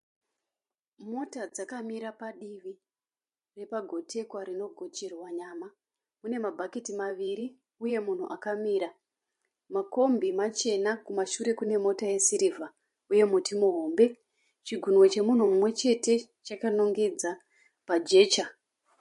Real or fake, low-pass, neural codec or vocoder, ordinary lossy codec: fake; 14.4 kHz; vocoder, 44.1 kHz, 128 mel bands every 512 samples, BigVGAN v2; MP3, 48 kbps